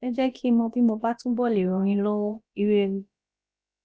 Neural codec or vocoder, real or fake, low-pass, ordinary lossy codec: codec, 16 kHz, about 1 kbps, DyCAST, with the encoder's durations; fake; none; none